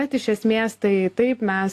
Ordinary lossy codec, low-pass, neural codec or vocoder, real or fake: AAC, 64 kbps; 14.4 kHz; none; real